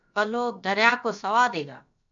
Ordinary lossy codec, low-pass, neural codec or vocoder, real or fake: AAC, 64 kbps; 7.2 kHz; codec, 16 kHz, about 1 kbps, DyCAST, with the encoder's durations; fake